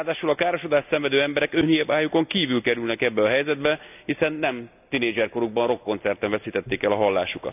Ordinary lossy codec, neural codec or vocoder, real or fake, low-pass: none; none; real; 3.6 kHz